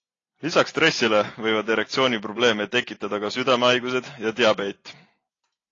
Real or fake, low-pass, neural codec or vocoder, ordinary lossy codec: real; 7.2 kHz; none; AAC, 32 kbps